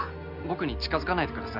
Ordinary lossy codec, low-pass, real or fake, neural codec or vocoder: none; 5.4 kHz; real; none